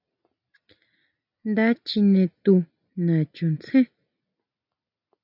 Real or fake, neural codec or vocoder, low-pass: real; none; 5.4 kHz